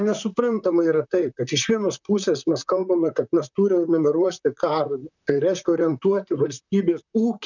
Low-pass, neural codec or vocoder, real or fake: 7.2 kHz; vocoder, 44.1 kHz, 128 mel bands, Pupu-Vocoder; fake